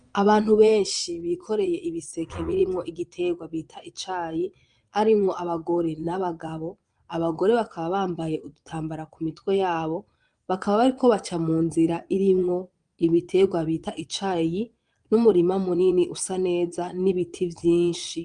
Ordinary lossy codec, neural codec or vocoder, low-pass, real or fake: Opus, 32 kbps; vocoder, 22.05 kHz, 80 mel bands, Vocos; 9.9 kHz; fake